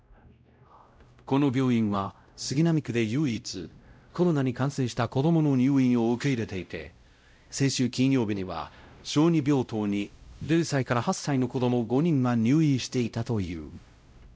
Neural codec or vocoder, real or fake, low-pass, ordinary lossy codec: codec, 16 kHz, 0.5 kbps, X-Codec, WavLM features, trained on Multilingual LibriSpeech; fake; none; none